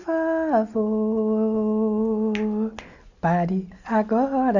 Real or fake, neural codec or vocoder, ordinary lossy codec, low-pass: real; none; none; 7.2 kHz